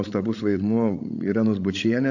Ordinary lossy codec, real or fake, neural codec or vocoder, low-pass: AAC, 48 kbps; fake; codec, 16 kHz, 16 kbps, FreqCodec, larger model; 7.2 kHz